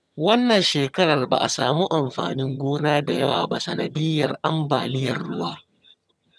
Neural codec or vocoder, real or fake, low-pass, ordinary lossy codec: vocoder, 22.05 kHz, 80 mel bands, HiFi-GAN; fake; none; none